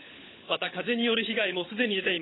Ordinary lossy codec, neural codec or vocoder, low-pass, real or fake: AAC, 16 kbps; codec, 16 kHz, 16 kbps, FunCodec, trained on LibriTTS, 50 frames a second; 7.2 kHz; fake